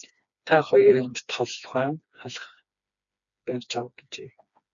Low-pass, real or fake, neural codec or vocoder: 7.2 kHz; fake; codec, 16 kHz, 2 kbps, FreqCodec, smaller model